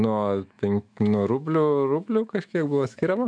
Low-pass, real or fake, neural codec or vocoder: 9.9 kHz; fake; autoencoder, 48 kHz, 128 numbers a frame, DAC-VAE, trained on Japanese speech